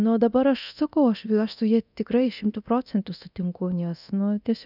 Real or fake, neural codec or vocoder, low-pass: fake; codec, 24 kHz, 0.9 kbps, DualCodec; 5.4 kHz